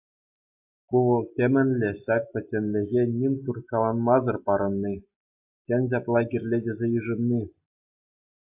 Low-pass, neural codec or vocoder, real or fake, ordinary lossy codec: 3.6 kHz; none; real; AAC, 32 kbps